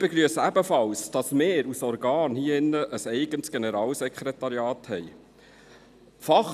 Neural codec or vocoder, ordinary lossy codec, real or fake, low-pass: none; none; real; 14.4 kHz